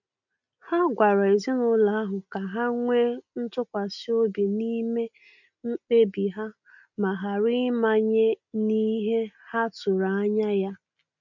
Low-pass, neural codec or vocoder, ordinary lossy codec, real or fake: 7.2 kHz; none; none; real